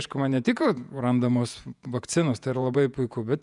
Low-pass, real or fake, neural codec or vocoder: 10.8 kHz; fake; vocoder, 48 kHz, 128 mel bands, Vocos